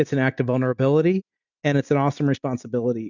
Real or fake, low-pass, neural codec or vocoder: real; 7.2 kHz; none